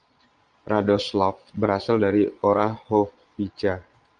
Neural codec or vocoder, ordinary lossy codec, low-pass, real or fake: vocoder, 22.05 kHz, 80 mel bands, Vocos; Opus, 32 kbps; 9.9 kHz; fake